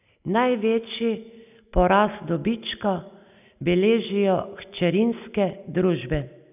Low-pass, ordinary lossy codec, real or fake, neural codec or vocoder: 3.6 kHz; none; real; none